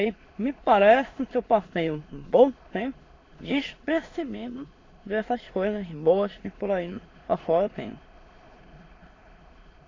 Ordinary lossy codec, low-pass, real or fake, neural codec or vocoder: AAC, 32 kbps; 7.2 kHz; fake; autoencoder, 22.05 kHz, a latent of 192 numbers a frame, VITS, trained on many speakers